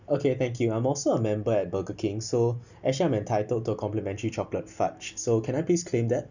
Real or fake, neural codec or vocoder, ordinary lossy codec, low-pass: real; none; none; 7.2 kHz